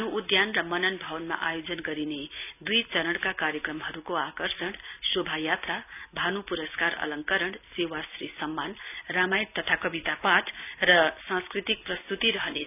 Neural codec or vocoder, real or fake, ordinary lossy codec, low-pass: none; real; none; 3.6 kHz